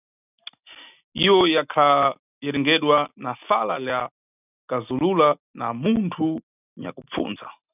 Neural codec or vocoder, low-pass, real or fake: none; 3.6 kHz; real